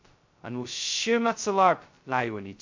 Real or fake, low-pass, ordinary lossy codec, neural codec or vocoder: fake; 7.2 kHz; MP3, 48 kbps; codec, 16 kHz, 0.2 kbps, FocalCodec